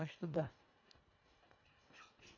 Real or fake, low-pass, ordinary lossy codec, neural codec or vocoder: fake; 7.2 kHz; none; codec, 24 kHz, 1.5 kbps, HILCodec